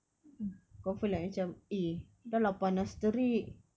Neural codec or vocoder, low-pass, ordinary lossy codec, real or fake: none; none; none; real